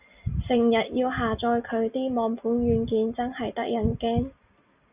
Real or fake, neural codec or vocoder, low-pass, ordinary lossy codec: real; none; 3.6 kHz; Opus, 64 kbps